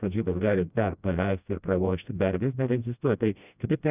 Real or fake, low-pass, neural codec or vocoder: fake; 3.6 kHz; codec, 16 kHz, 1 kbps, FreqCodec, smaller model